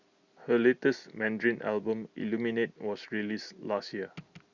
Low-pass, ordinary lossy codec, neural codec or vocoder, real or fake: 7.2 kHz; Opus, 64 kbps; none; real